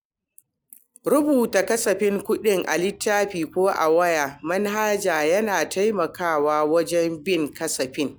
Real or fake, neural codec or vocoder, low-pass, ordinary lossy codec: real; none; none; none